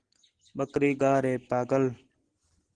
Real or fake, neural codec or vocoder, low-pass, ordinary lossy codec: real; none; 9.9 kHz; Opus, 16 kbps